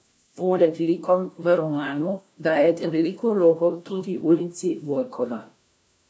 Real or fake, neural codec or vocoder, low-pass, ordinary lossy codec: fake; codec, 16 kHz, 1 kbps, FunCodec, trained on LibriTTS, 50 frames a second; none; none